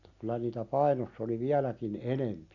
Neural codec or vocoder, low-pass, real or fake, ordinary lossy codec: none; 7.2 kHz; real; none